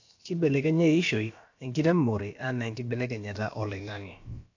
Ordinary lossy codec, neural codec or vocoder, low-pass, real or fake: AAC, 48 kbps; codec, 16 kHz, about 1 kbps, DyCAST, with the encoder's durations; 7.2 kHz; fake